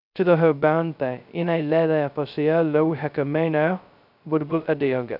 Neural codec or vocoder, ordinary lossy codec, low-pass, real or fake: codec, 16 kHz, 0.2 kbps, FocalCodec; none; 5.4 kHz; fake